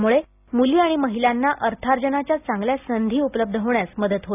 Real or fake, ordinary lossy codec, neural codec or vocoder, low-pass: real; none; none; 3.6 kHz